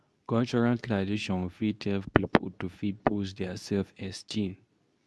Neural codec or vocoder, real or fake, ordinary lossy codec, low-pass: codec, 24 kHz, 0.9 kbps, WavTokenizer, medium speech release version 2; fake; none; none